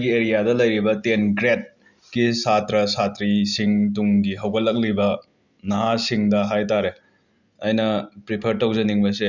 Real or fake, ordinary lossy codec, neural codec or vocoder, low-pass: real; Opus, 64 kbps; none; 7.2 kHz